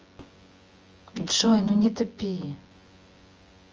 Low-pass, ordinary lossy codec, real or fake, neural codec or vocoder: 7.2 kHz; Opus, 24 kbps; fake; vocoder, 24 kHz, 100 mel bands, Vocos